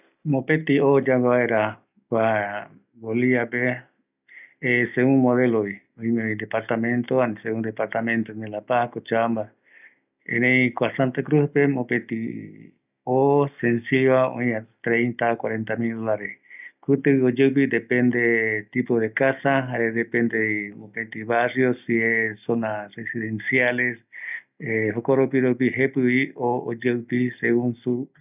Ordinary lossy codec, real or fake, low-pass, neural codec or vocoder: none; real; 3.6 kHz; none